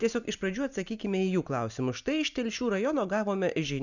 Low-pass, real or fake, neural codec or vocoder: 7.2 kHz; real; none